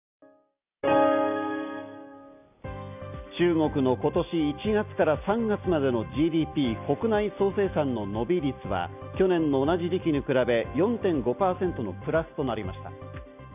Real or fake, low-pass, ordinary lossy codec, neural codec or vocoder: real; 3.6 kHz; none; none